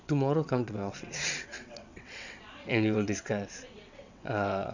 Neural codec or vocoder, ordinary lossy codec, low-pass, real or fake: vocoder, 22.05 kHz, 80 mel bands, WaveNeXt; none; 7.2 kHz; fake